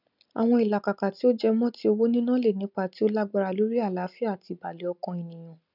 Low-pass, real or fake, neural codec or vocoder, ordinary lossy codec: 5.4 kHz; real; none; none